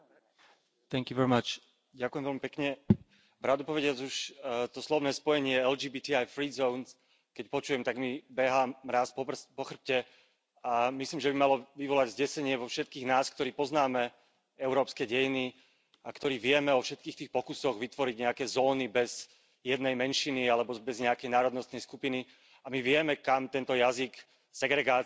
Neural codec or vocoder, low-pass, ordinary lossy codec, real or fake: none; none; none; real